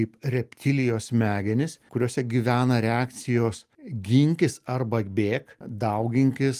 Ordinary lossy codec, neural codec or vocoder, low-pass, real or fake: Opus, 32 kbps; none; 14.4 kHz; real